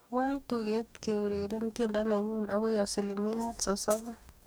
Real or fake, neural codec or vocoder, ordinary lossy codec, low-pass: fake; codec, 44.1 kHz, 2.6 kbps, DAC; none; none